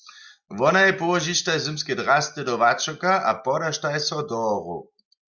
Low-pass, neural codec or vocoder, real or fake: 7.2 kHz; none; real